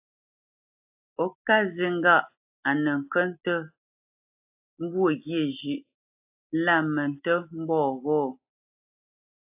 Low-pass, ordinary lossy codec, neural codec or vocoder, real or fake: 3.6 kHz; AAC, 32 kbps; none; real